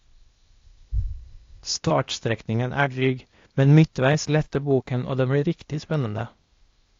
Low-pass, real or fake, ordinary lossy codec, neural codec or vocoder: 7.2 kHz; fake; AAC, 48 kbps; codec, 16 kHz, 0.8 kbps, ZipCodec